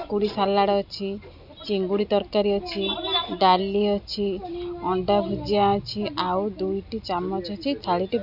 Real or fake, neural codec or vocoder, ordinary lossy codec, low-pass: real; none; none; 5.4 kHz